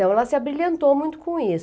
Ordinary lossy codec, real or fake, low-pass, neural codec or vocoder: none; real; none; none